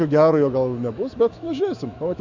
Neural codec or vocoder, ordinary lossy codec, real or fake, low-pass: autoencoder, 48 kHz, 128 numbers a frame, DAC-VAE, trained on Japanese speech; Opus, 64 kbps; fake; 7.2 kHz